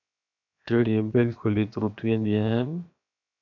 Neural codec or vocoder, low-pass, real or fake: codec, 16 kHz, 0.7 kbps, FocalCodec; 7.2 kHz; fake